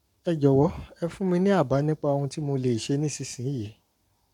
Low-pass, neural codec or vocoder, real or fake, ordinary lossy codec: 19.8 kHz; codec, 44.1 kHz, 7.8 kbps, Pupu-Codec; fake; none